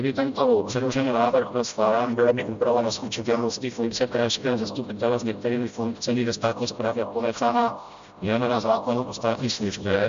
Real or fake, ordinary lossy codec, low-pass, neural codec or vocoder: fake; MP3, 64 kbps; 7.2 kHz; codec, 16 kHz, 0.5 kbps, FreqCodec, smaller model